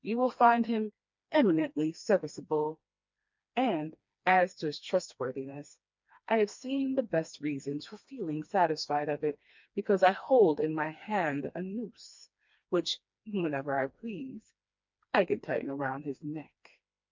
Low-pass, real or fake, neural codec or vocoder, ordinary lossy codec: 7.2 kHz; fake; codec, 16 kHz, 2 kbps, FreqCodec, smaller model; MP3, 64 kbps